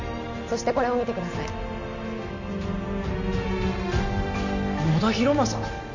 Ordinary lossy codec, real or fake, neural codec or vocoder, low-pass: none; real; none; 7.2 kHz